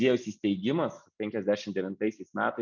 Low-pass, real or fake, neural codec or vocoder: 7.2 kHz; real; none